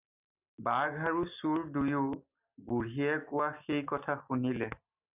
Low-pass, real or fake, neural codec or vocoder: 3.6 kHz; real; none